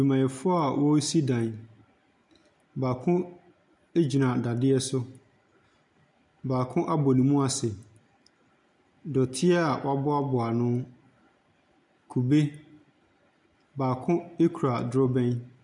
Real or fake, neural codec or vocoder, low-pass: real; none; 10.8 kHz